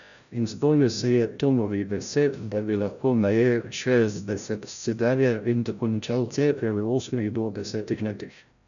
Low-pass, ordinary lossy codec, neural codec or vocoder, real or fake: 7.2 kHz; none; codec, 16 kHz, 0.5 kbps, FreqCodec, larger model; fake